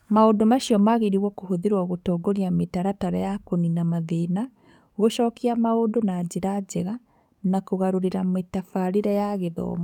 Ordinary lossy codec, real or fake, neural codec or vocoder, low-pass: none; fake; codec, 44.1 kHz, 7.8 kbps, DAC; 19.8 kHz